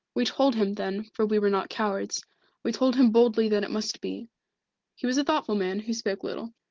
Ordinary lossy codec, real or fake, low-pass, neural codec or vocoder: Opus, 16 kbps; real; 7.2 kHz; none